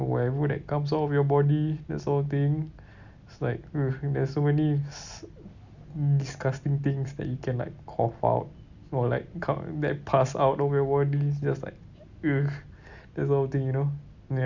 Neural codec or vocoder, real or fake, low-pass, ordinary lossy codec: none; real; 7.2 kHz; none